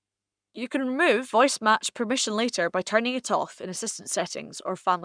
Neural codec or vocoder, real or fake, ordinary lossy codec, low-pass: codec, 44.1 kHz, 7.8 kbps, Pupu-Codec; fake; none; 9.9 kHz